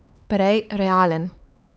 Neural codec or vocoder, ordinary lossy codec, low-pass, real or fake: codec, 16 kHz, 4 kbps, X-Codec, HuBERT features, trained on LibriSpeech; none; none; fake